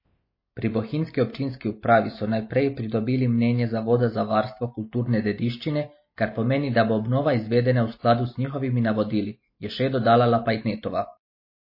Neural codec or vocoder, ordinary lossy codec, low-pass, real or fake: none; MP3, 24 kbps; 5.4 kHz; real